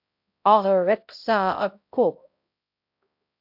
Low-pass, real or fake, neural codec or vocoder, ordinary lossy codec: 5.4 kHz; fake; codec, 16 kHz, 0.5 kbps, X-Codec, HuBERT features, trained on balanced general audio; AAC, 48 kbps